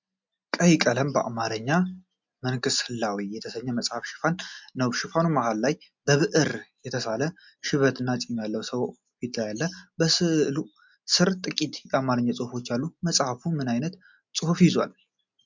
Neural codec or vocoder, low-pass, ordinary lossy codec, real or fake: none; 7.2 kHz; MP3, 64 kbps; real